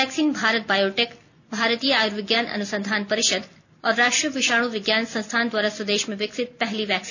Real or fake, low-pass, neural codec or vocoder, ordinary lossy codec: real; 7.2 kHz; none; none